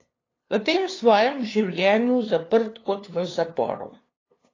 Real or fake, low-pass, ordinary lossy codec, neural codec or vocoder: fake; 7.2 kHz; AAC, 32 kbps; codec, 16 kHz, 2 kbps, FunCodec, trained on LibriTTS, 25 frames a second